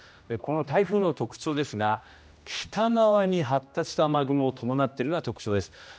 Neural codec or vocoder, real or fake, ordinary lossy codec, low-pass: codec, 16 kHz, 1 kbps, X-Codec, HuBERT features, trained on general audio; fake; none; none